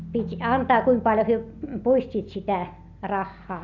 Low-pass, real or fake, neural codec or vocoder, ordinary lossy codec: 7.2 kHz; real; none; none